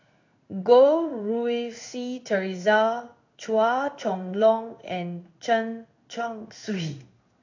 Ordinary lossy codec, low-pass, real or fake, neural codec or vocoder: AAC, 48 kbps; 7.2 kHz; fake; codec, 16 kHz in and 24 kHz out, 1 kbps, XY-Tokenizer